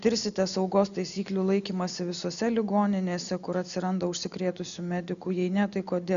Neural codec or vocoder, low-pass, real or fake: none; 7.2 kHz; real